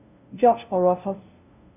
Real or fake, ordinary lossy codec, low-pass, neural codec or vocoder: fake; none; 3.6 kHz; codec, 16 kHz, 0.5 kbps, FunCodec, trained on LibriTTS, 25 frames a second